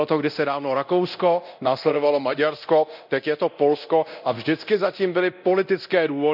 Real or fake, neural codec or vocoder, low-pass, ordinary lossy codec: fake; codec, 24 kHz, 0.9 kbps, DualCodec; 5.4 kHz; none